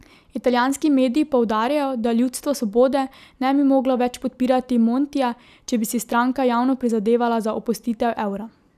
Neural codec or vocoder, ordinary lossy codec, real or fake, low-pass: none; none; real; 14.4 kHz